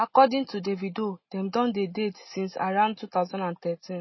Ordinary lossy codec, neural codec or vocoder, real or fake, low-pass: MP3, 24 kbps; none; real; 7.2 kHz